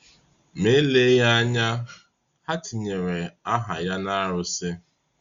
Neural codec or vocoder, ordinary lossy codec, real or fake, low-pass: none; Opus, 64 kbps; real; 7.2 kHz